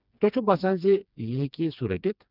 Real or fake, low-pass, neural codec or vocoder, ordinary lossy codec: fake; 5.4 kHz; codec, 16 kHz, 2 kbps, FreqCodec, smaller model; none